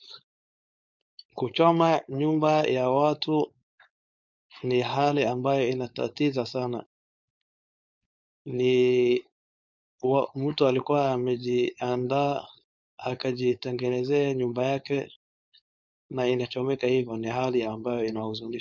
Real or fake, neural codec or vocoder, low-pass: fake; codec, 16 kHz, 4.8 kbps, FACodec; 7.2 kHz